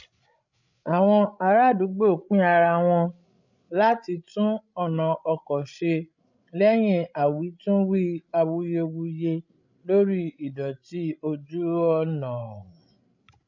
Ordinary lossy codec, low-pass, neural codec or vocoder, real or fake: none; 7.2 kHz; codec, 16 kHz, 16 kbps, FreqCodec, larger model; fake